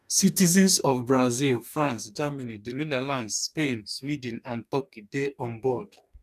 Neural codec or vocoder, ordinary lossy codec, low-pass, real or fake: codec, 44.1 kHz, 2.6 kbps, DAC; none; 14.4 kHz; fake